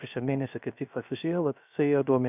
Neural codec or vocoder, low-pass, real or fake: codec, 16 kHz, 0.3 kbps, FocalCodec; 3.6 kHz; fake